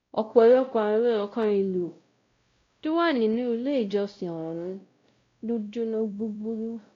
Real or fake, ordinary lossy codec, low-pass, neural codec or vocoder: fake; AAC, 48 kbps; 7.2 kHz; codec, 16 kHz, 0.5 kbps, X-Codec, WavLM features, trained on Multilingual LibriSpeech